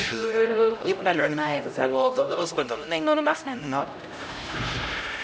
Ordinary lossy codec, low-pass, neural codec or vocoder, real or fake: none; none; codec, 16 kHz, 0.5 kbps, X-Codec, HuBERT features, trained on LibriSpeech; fake